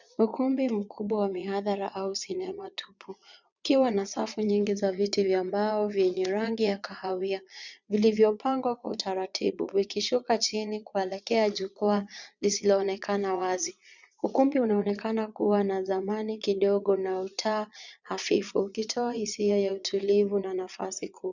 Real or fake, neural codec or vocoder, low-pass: fake; vocoder, 22.05 kHz, 80 mel bands, Vocos; 7.2 kHz